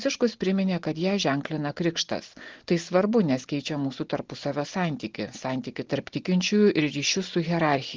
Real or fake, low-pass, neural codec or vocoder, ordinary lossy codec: real; 7.2 kHz; none; Opus, 16 kbps